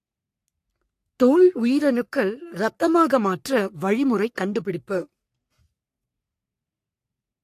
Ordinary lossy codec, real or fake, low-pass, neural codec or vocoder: AAC, 64 kbps; fake; 14.4 kHz; codec, 44.1 kHz, 3.4 kbps, Pupu-Codec